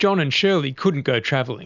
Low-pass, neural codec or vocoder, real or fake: 7.2 kHz; none; real